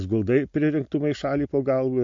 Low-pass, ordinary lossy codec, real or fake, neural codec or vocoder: 7.2 kHz; MP3, 48 kbps; real; none